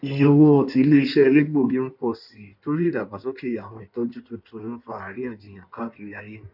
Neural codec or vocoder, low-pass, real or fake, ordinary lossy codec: codec, 16 kHz in and 24 kHz out, 1.1 kbps, FireRedTTS-2 codec; 5.4 kHz; fake; none